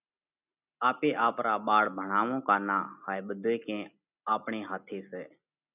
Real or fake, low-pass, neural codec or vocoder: real; 3.6 kHz; none